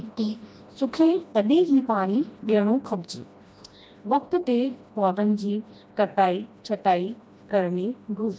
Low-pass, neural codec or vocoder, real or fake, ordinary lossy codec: none; codec, 16 kHz, 1 kbps, FreqCodec, smaller model; fake; none